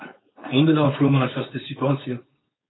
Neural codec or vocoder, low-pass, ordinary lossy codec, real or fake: codec, 16 kHz, 4.8 kbps, FACodec; 7.2 kHz; AAC, 16 kbps; fake